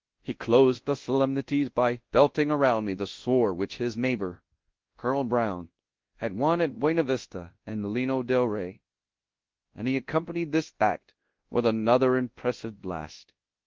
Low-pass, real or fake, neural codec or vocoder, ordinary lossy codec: 7.2 kHz; fake; codec, 24 kHz, 0.9 kbps, WavTokenizer, large speech release; Opus, 16 kbps